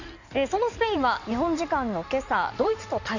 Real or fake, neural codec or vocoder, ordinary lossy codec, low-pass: fake; codec, 16 kHz in and 24 kHz out, 2.2 kbps, FireRedTTS-2 codec; none; 7.2 kHz